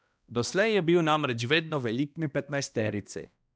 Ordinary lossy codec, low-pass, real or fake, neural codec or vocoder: none; none; fake; codec, 16 kHz, 1 kbps, X-Codec, HuBERT features, trained on balanced general audio